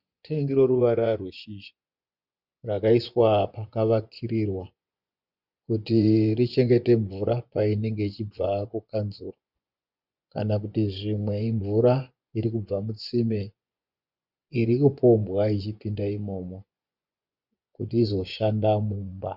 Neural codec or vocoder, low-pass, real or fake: vocoder, 24 kHz, 100 mel bands, Vocos; 5.4 kHz; fake